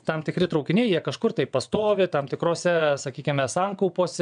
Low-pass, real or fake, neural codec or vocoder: 9.9 kHz; fake; vocoder, 22.05 kHz, 80 mel bands, WaveNeXt